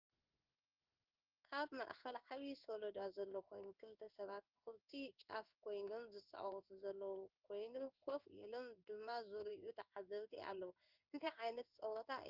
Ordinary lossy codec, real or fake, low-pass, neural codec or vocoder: Opus, 32 kbps; fake; 5.4 kHz; codec, 16 kHz in and 24 kHz out, 1 kbps, XY-Tokenizer